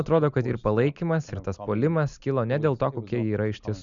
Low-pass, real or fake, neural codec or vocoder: 7.2 kHz; real; none